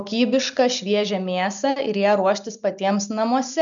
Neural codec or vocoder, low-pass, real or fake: none; 7.2 kHz; real